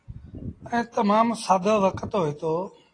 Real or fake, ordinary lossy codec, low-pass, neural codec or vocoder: real; AAC, 32 kbps; 9.9 kHz; none